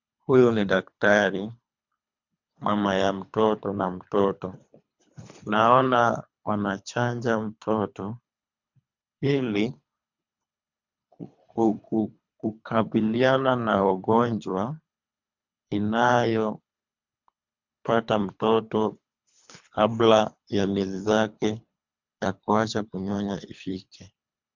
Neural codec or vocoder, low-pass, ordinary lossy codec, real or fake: codec, 24 kHz, 3 kbps, HILCodec; 7.2 kHz; MP3, 64 kbps; fake